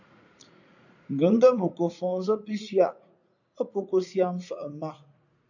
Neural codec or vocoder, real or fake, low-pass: vocoder, 44.1 kHz, 80 mel bands, Vocos; fake; 7.2 kHz